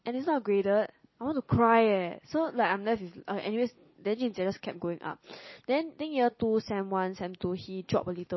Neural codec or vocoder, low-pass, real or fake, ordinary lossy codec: none; 7.2 kHz; real; MP3, 24 kbps